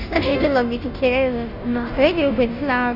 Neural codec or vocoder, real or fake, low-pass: codec, 16 kHz, 0.5 kbps, FunCodec, trained on Chinese and English, 25 frames a second; fake; 5.4 kHz